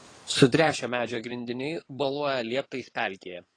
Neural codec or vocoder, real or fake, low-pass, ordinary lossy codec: codec, 16 kHz in and 24 kHz out, 2.2 kbps, FireRedTTS-2 codec; fake; 9.9 kHz; AAC, 32 kbps